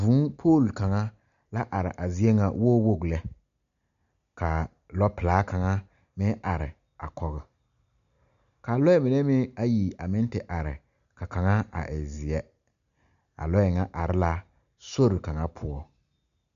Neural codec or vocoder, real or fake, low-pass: none; real; 7.2 kHz